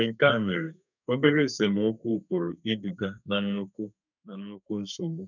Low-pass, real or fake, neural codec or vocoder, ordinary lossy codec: 7.2 kHz; fake; codec, 32 kHz, 1.9 kbps, SNAC; none